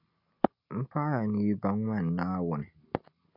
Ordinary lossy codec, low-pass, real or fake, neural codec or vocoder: MP3, 48 kbps; 5.4 kHz; real; none